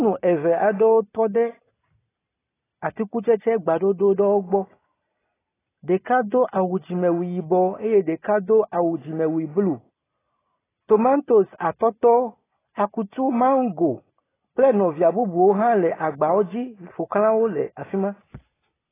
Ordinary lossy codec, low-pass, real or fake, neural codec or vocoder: AAC, 16 kbps; 3.6 kHz; real; none